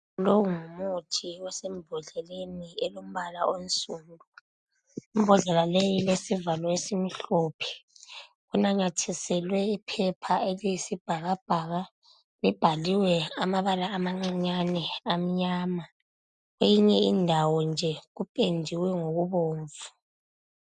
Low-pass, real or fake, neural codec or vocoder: 9.9 kHz; real; none